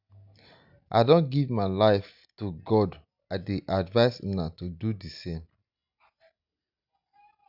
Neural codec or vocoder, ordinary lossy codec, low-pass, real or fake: none; none; 5.4 kHz; real